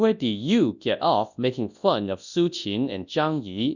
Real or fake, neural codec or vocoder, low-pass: fake; codec, 24 kHz, 0.9 kbps, WavTokenizer, large speech release; 7.2 kHz